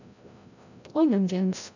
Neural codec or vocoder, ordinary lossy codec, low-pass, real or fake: codec, 16 kHz, 0.5 kbps, FreqCodec, larger model; none; 7.2 kHz; fake